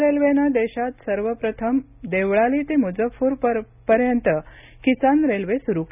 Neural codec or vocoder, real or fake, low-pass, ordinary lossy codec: none; real; 3.6 kHz; none